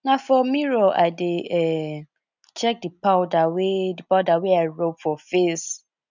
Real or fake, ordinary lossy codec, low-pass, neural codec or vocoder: real; none; 7.2 kHz; none